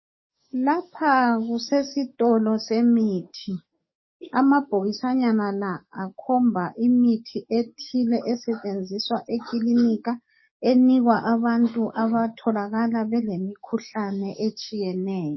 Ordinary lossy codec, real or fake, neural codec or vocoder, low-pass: MP3, 24 kbps; fake; codec, 44.1 kHz, 7.8 kbps, DAC; 7.2 kHz